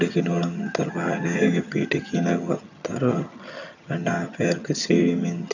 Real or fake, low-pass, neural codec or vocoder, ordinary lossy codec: fake; 7.2 kHz; vocoder, 22.05 kHz, 80 mel bands, HiFi-GAN; none